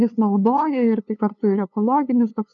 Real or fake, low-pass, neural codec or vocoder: fake; 7.2 kHz; codec, 16 kHz, 2 kbps, FunCodec, trained on LibriTTS, 25 frames a second